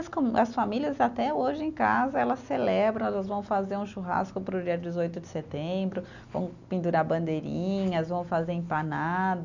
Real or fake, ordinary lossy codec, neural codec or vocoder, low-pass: real; none; none; 7.2 kHz